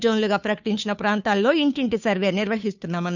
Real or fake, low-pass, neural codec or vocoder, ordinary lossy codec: fake; 7.2 kHz; codec, 16 kHz, 2 kbps, FunCodec, trained on Chinese and English, 25 frames a second; none